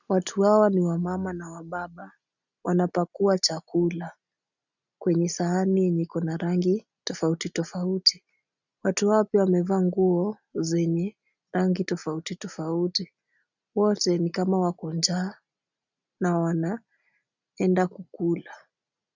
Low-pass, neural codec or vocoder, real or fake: 7.2 kHz; none; real